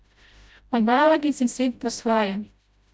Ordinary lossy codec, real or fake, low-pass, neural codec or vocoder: none; fake; none; codec, 16 kHz, 0.5 kbps, FreqCodec, smaller model